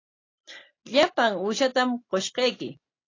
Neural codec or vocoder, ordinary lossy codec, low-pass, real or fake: none; AAC, 32 kbps; 7.2 kHz; real